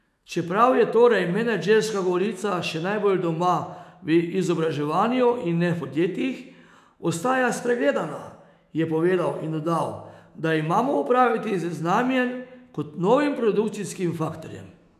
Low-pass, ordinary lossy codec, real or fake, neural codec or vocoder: 14.4 kHz; none; fake; autoencoder, 48 kHz, 128 numbers a frame, DAC-VAE, trained on Japanese speech